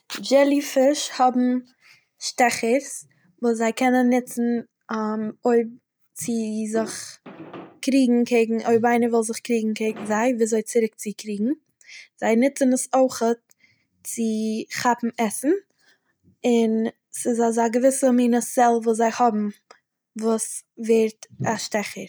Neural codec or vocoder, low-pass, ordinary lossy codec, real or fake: none; none; none; real